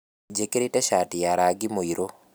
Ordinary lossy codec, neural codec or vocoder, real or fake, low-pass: none; none; real; none